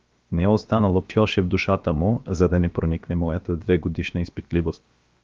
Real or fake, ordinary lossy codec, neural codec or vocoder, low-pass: fake; Opus, 32 kbps; codec, 16 kHz, about 1 kbps, DyCAST, with the encoder's durations; 7.2 kHz